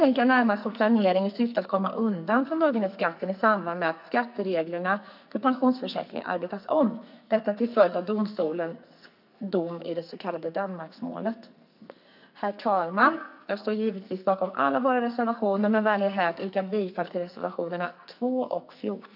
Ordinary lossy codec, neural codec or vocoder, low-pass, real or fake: none; codec, 44.1 kHz, 2.6 kbps, SNAC; 5.4 kHz; fake